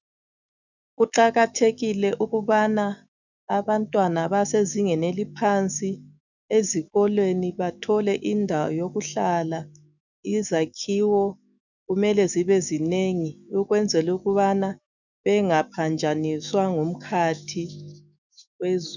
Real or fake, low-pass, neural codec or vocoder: fake; 7.2 kHz; autoencoder, 48 kHz, 128 numbers a frame, DAC-VAE, trained on Japanese speech